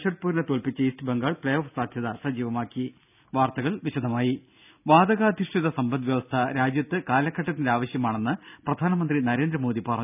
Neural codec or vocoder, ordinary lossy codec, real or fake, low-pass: none; none; real; 3.6 kHz